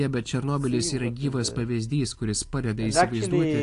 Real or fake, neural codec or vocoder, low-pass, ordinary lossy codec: real; none; 10.8 kHz; AAC, 48 kbps